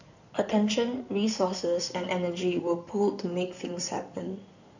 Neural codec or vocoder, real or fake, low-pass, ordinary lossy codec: codec, 16 kHz in and 24 kHz out, 2.2 kbps, FireRedTTS-2 codec; fake; 7.2 kHz; none